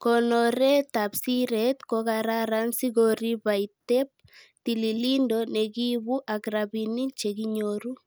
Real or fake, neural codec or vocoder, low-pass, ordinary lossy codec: real; none; none; none